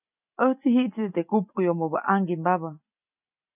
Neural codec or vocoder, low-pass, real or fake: none; 3.6 kHz; real